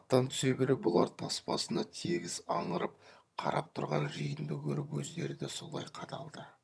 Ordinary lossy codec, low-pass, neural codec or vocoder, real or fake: none; none; vocoder, 22.05 kHz, 80 mel bands, HiFi-GAN; fake